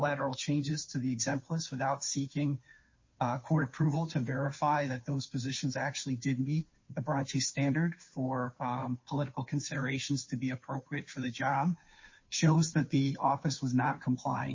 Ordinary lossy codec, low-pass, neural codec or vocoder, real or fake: MP3, 32 kbps; 7.2 kHz; codec, 16 kHz, 2 kbps, FunCodec, trained on Chinese and English, 25 frames a second; fake